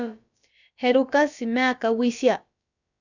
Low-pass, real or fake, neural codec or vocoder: 7.2 kHz; fake; codec, 16 kHz, about 1 kbps, DyCAST, with the encoder's durations